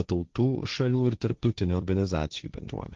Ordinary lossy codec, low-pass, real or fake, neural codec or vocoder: Opus, 24 kbps; 7.2 kHz; fake; codec, 16 kHz, 1.1 kbps, Voila-Tokenizer